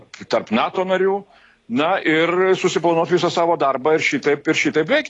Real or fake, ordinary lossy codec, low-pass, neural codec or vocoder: real; AAC, 32 kbps; 10.8 kHz; none